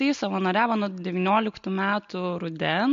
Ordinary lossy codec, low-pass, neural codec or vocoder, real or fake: MP3, 48 kbps; 7.2 kHz; none; real